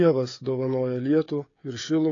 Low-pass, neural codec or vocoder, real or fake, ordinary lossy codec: 7.2 kHz; codec, 16 kHz, 16 kbps, FreqCodec, smaller model; fake; AAC, 32 kbps